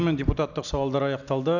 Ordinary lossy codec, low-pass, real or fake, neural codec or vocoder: none; 7.2 kHz; real; none